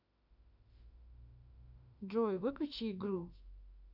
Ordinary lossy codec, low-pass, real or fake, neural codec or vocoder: none; 5.4 kHz; fake; autoencoder, 48 kHz, 32 numbers a frame, DAC-VAE, trained on Japanese speech